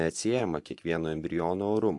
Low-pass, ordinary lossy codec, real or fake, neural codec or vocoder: 10.8 kHz; AAC, 64 kbps; fake; vocoder, 24 kHz, 100 mel bands, Vocos